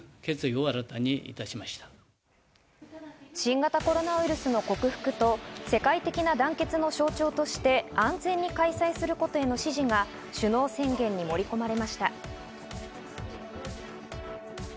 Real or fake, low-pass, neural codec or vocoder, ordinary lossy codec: real; none; none; none